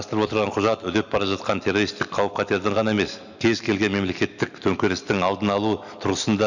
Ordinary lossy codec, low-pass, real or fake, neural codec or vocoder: none; 7.2 kHz; real; none